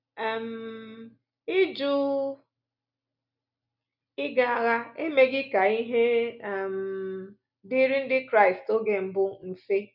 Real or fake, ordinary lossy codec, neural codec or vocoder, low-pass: real; none; none; 5.4 kHz